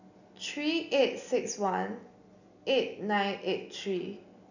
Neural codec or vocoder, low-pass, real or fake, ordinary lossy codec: none; 7.2 kHz; real; none